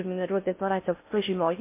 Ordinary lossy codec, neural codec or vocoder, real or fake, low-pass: MP3, 24 kbps; codec, 16 kHz in and 24 kHz out, 0.6 kbps, FocalCodec, streaming, 4096 codes; fake; 3.6 kHz